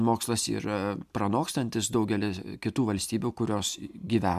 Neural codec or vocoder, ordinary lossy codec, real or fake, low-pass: none; MP3, 96 kbps; real; 14.4 kHz